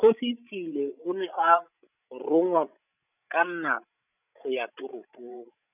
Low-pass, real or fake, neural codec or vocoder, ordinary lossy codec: 3.6 kHz; fake; codec, 16 kHz, 16 kbps, FreqCodec, larger model; none